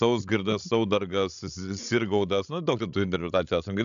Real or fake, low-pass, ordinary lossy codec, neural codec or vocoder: fake; 7.2 kHz; AAC, 96 kbps; codec, 16 kHz, 16 kbps, FreqCodec, larger model